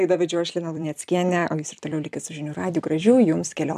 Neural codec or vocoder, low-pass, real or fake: vocoder, 44.1 kHz, 128 mel bands every 256 samples, BigVGAN v2; 14.4 kHz; fake